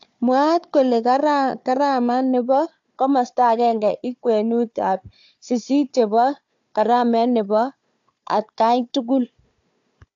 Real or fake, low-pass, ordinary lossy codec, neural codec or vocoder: fake; 7.2 kHz; AAC, 64 kbps; codec, 16 kHz, 4 kbps, FunCodec, trained on Chinese and English, 50 frames a second